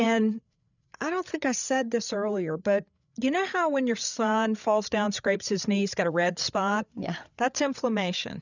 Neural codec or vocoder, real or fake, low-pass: codec, 16 kHz, 8 kbps, FreqCodec, larger model; fake; 7.2 kHz